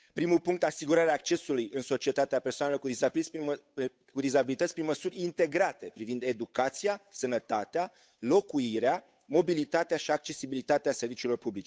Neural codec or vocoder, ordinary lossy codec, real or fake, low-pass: codec, 16 kHz, 8 kbps, FunCodec, trained on Chinese and English, 25 frames a second; none; fake; none